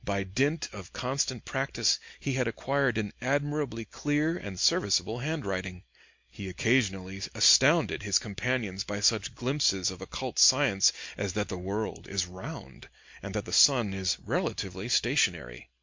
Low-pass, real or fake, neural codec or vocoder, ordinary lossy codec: 7.2 kHz; real; none; MP3, 48 kbps